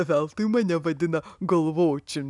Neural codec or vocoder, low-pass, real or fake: none; 10.8 kHz; real